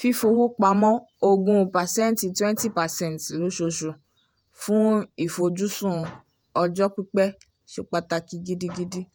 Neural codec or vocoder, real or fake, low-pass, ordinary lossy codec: vocoder, 48 kHz, 128 mel bands, Vocos; fake; none; none